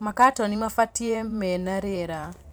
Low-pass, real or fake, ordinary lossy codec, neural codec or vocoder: none; fake; none; vocoder, 44.1 kHz, 128 mel bands every 512 samples, BigVGAN v2